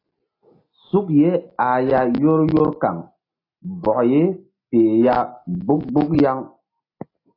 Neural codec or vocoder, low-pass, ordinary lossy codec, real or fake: none; 5.4 kHz; AAC, 24 kbps; real